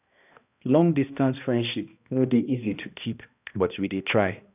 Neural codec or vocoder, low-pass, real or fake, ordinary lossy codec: codec, 16 kHz, 1 kbps, X-Codec, HuBERT features, trained on balanced general audio; 3.6 kHz; fake; none